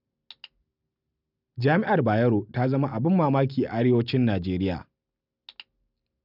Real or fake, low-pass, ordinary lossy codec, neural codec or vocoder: real; 5.4 kHz; none; none